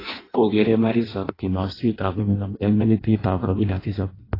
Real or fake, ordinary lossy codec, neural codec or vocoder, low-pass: fake; AAC, 24 kbps; codec, 16 kHz in and 24 kHz out, 0.6 kbps, FireRedTTS-2 codec; 5.4 kHz